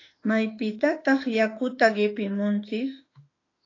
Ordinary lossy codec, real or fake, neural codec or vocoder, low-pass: AAC, 32 kbps; fake; autoencoder, 48 kHz, 32 numbers a frame, DAC-VAE, trained on Japanese speech; 7.2 kHz